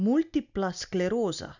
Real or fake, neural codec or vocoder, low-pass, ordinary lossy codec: real; none; 7.2 kHz; none